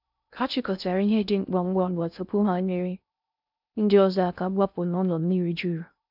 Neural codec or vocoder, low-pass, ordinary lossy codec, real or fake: codec, 16 kHz in and 24 kHz out, 0.6 kbps, FocalCodec, streaming, 2048 codes; 5.4 kHz; none; fake